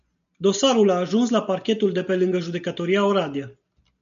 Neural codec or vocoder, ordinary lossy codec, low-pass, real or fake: none; AAC, 96 kbps; 7.2 kHz; real